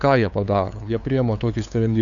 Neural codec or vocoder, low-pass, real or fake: codec, 16 kHz, 2 kbps, FunCodec, trained on LibriTTS, 25 frames a second; 7.2 kHz; fake